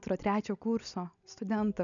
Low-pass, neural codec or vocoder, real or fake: 7.2 kHz; none; real